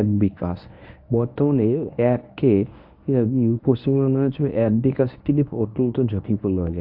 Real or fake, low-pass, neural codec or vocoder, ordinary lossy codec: fake; 5.4 kHz; codec, 24 kHz, 0.9 kbps, WavTokenizer, medium speech release version 1; AAC, 48 kbps